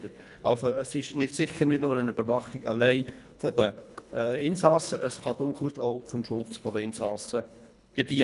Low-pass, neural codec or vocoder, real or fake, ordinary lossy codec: 10.8 kHz; codec, 24 kHz, 1.5 kbps, HILCodec; fake; none